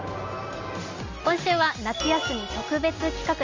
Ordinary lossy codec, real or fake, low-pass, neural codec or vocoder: Opus, 32 kbps; real; 7.2 kHz; none